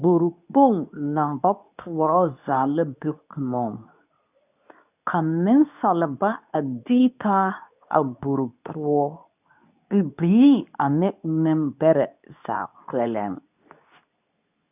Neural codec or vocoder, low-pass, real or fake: codec, 24 kHz, 0.9 kbps, WavTokenizer, medium speech release version 2; 3.6 kHz; fake